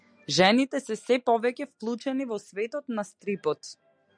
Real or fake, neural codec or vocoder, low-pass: real; none; 9.9 kHz